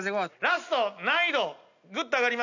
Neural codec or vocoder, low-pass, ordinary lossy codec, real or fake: none; 7.2 kHz; none; real